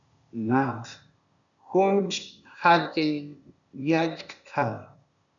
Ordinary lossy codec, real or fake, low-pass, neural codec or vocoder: MP3, 96 kbps; fake; 7.2 kHz; codec, 16 kHz, 0.8 kbps, ZipCodec